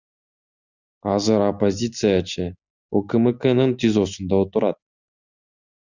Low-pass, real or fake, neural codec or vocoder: 7.2 kHz; real; none